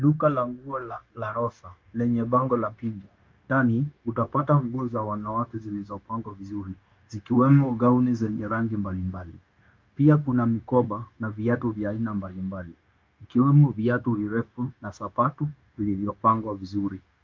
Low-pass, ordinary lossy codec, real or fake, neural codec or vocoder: 7.2 kHz; Opus, 24 kbps; fake; codec, 16 kHz, 0.9 kbps, LongCat-Audio-Codec